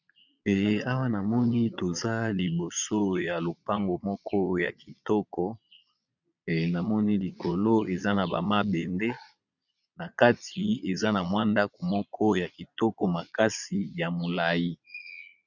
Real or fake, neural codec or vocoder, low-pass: fake; vocoder, 22.05 kHz, 80 mel bands, Vocos; 7.2 kHz